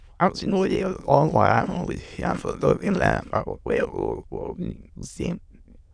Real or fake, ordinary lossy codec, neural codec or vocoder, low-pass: fake; none; autoencoder, 22.05 kHz, a latent of 192 numbers a frame, VITS, trained on many speakers; 9.9 kHz